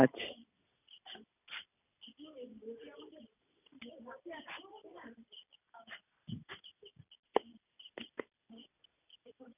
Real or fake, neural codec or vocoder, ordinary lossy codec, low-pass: real; none; none; 3.6 kHz